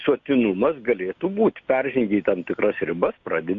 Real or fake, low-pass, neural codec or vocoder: real; 7.2 kHz; none